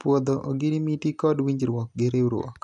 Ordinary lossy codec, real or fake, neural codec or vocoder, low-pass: none; real; none; 10.8 kHz